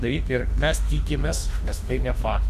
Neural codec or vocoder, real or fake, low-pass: autoencoder, 48 kHz, 32 numbers a frame, DAC-VAE, trained on Japanese speech; fake; 14.4 kHz